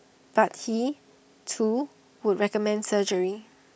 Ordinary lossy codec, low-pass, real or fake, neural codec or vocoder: none; none; real; none